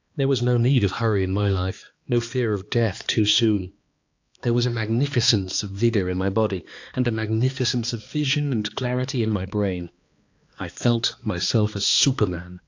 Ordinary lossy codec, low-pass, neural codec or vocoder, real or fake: AAC, 48 kbps; 7.2 kHz; codec, 16 kHz, 2 kbps, X-Codec, HuBERT features, trained on balanced general audio; fake